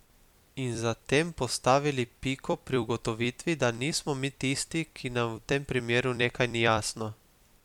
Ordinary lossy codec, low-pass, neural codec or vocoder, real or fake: MP3, 96 kbps; 19.8 kHz; vocoder, 44.1 kHz, 128 mel bands every 256 samples, BigVGAN v2; fake